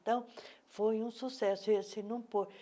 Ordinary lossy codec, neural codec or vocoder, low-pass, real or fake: none; none; none; real